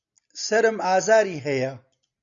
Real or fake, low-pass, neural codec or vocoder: real; 7.2 kHz; none